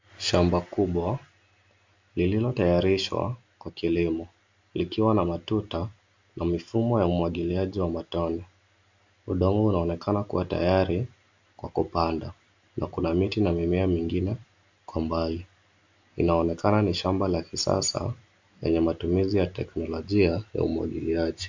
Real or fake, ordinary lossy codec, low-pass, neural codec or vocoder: real; MP3, 64 kbps; 7.2 kHz; none